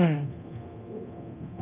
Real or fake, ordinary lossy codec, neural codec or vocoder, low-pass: fake; Opus, 32 kbps; codec, 24 kHz, 0.9 kbps, DualCodec; 3.6 kHz